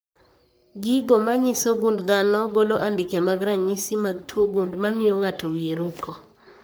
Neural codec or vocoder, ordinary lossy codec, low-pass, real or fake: codec, 44.1 kHz, 3.4 kbps, Pupu-Codec; none; none; fake